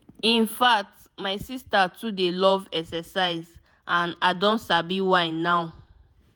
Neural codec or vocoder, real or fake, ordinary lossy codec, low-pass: vocoder, 48 kHz, 128 mel bands, Vocos; fake; none; none